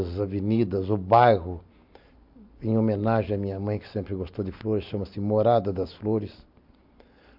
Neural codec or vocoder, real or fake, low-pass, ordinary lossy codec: none; real; 5.4 kHz; MP3, 48 kbps